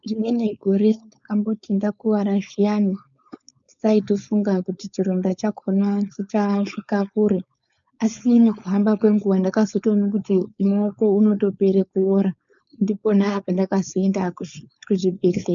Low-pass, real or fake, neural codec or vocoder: 7.2 kHz; fake; codec, 16 kHz, 4.8 kbps, FACodec